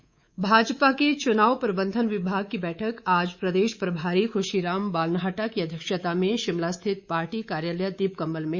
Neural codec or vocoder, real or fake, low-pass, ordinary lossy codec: codec, 24 kHz, 3.1 kbps, DualCodec; fake; 7.2 kHz; Opus, 64 kbps